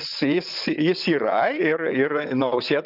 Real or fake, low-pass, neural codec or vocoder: fake; 5.4 kHz; vocoder, 22.05 kHz, 80 mel bands, WaveNeXt